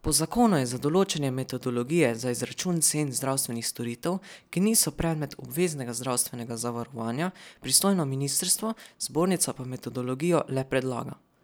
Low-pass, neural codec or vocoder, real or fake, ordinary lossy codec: none; none; real; none